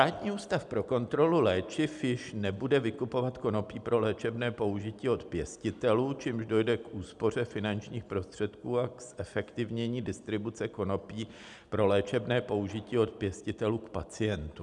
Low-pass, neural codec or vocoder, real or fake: 10.8 kHz; none; real